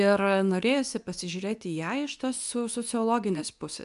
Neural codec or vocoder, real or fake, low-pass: codec, 24 kHz, 0.9 kbps, WavTokenizer, medium speech release version 2; fake; 10.8 kHz